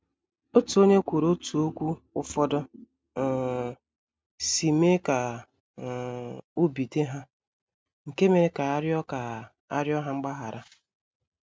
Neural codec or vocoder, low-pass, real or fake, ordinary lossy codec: none; none; real; none